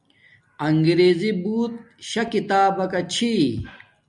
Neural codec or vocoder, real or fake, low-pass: none; real; 10.8 kHz